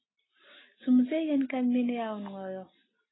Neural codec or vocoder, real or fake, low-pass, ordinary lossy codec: none; real; 7.2 kHz; AAC, 16 kbps